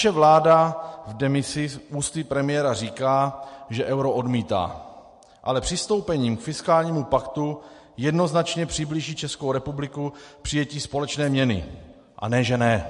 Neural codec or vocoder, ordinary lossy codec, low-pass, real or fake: none; MP3, 48 kbps; 14.4 kHz; real